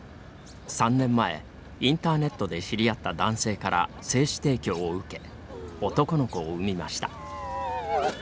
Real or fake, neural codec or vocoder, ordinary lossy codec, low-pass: real; none; none; none